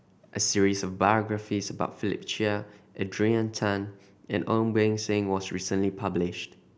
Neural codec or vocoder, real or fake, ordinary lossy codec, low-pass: none; real; none; none